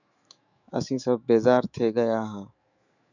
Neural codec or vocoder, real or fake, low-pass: autoencoder, 48 kHz, 128 numbers a frame, DAC-VAE, trained on Japanese speech; fake; 7.2 kHz